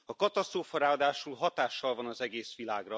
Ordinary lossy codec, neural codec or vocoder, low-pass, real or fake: none; none; none; real